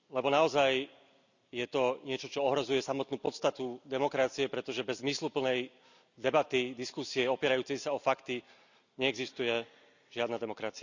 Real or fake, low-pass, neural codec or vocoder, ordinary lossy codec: real; 7.2 kHz; none; none